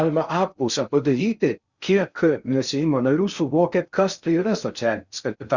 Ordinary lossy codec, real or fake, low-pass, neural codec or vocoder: Opus, 64 kbps; fake; 7.2 kHz; codec, 16 kHz in and 24 kHz out, 0.6 kbps, FocalCodec, streaming, 4096 codes